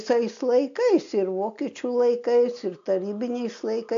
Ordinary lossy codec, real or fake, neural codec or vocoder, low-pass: AAC, 64 kbps; real; none; 7.2 kHz